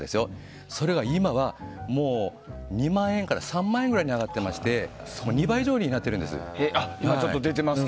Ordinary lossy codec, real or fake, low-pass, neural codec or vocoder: none; real; none; none